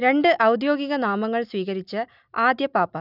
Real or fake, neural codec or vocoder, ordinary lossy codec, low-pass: real; none; none; 5.4 kHz